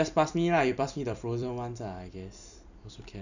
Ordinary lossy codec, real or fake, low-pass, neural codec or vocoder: none; fake; 7.2 kHz; vocoder, 44.1 kHz, 128 mel bands every 256 samples, BigVGAN v2